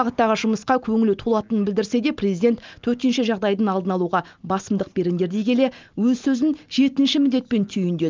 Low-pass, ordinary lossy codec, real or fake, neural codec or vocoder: 7.2 kHz; Opus, 24 kbps; real; none